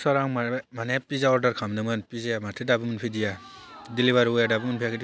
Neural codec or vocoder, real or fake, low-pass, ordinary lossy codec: none; real; none; none